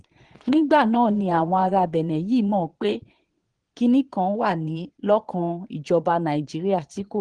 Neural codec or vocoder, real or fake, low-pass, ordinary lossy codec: vocoder, 22.05 kHz, 80 mel bands, Vocos; fake; 9.9 kHz; Opus, 16 kbps